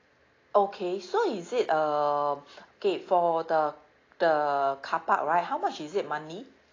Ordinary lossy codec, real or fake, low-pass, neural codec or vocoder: AAC, 32 kbps; real; 7.2 kHz; none